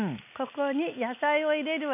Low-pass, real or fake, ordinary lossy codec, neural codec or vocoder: 3.6 kHz; real; none; none